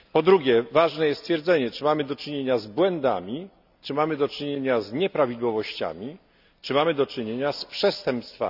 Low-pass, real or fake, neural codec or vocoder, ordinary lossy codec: 5.4 kHz; real; none; none